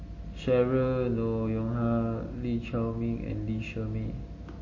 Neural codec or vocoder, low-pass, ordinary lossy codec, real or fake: none; 7.2 kHz; MP3, 32 kbps; real